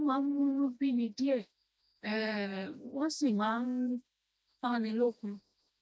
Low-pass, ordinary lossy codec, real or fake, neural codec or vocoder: none; none; fake; codec, 16 kHz, 1 kbps, FreqCodec, smaller model